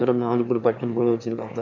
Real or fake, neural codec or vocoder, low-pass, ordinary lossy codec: fake; autoencoder, 22.05 kHz, a latent of 192 numbers a frame, VITS, trained on one speaker; 7.2 kHz; none